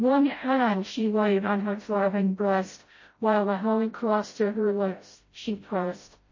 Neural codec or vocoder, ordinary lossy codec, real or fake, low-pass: codec, 16 kHz, 0.5 kbps, FreqCodec, smaller model; MP3, 32 kbps; fake; 7.2 kHz